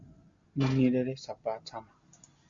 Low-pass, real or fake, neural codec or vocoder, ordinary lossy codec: 7.2 kHz; real; none; Opus, 64 kbps